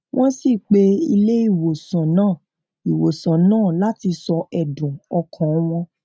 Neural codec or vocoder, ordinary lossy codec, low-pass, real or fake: none; none; none; real